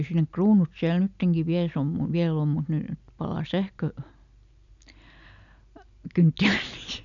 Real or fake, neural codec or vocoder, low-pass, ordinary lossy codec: real; none; 7.2 kHz; none